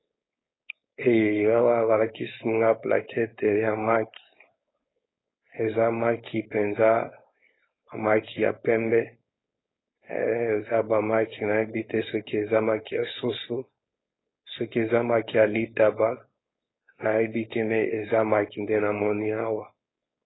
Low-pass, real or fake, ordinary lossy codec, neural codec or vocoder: 7.2 kHz; fake; AAC, 16 kbps; codec, 16 kHz, 4.8 kbps, FACodec